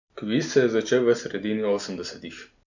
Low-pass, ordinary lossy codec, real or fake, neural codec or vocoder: 7.2 kHz; none; real; none